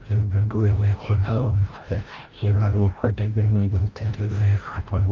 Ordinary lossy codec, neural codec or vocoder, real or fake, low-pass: Opus, 16 kbps; codec, 16 kHz, 0.5 kbps, FreqCodec, larger model; fake; 7.2 kHz